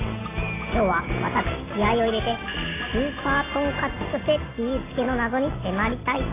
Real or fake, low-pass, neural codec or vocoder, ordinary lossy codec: real; 3.6 kHz; none; AAC, 16 kbps